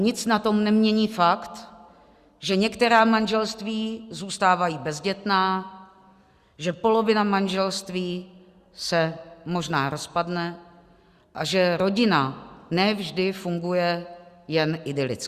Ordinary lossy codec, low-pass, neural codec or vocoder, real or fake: Opus, 64 kbps; 14.4 kHz; none; real